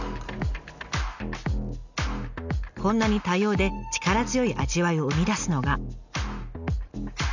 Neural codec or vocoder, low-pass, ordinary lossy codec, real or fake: none; 7.2 kHz; none; real